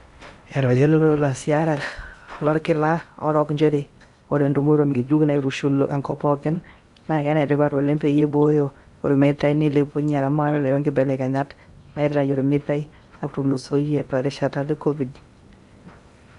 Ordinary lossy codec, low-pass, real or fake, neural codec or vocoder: Opus, 64 kbps; 10.8 kHz; fake; codec, 16 kHz in and 24 kHz out, 0.8 kbps, FocalCodec, streaming, 65536 codes